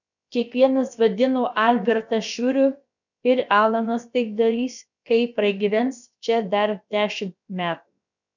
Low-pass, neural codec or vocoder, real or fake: 7.2 kHz; codec, 16 kHz, about 1 kbps, DyCAST, with the encoder's durations; fake